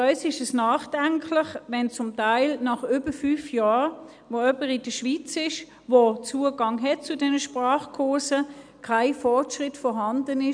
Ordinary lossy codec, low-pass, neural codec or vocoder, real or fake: none; 9.9 kHz; none; real